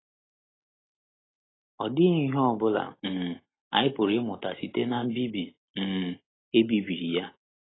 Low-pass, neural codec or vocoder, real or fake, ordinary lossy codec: 7.2 kHz; none; real; AAC, 16 kbps